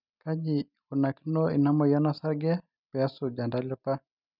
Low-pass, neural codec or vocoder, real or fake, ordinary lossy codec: 5.4 kHz; none; real; none